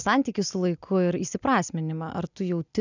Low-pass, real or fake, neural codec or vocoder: 7.2 kHz; real; none